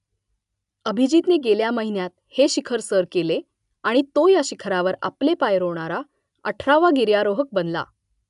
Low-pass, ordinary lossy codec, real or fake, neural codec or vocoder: 10.8 kHz; none; real; none